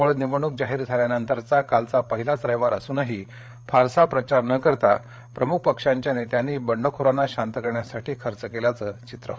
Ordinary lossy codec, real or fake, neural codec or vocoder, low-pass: none; fake; codec, 16 kHz, 8 kbps, FreqCodec, larger model; none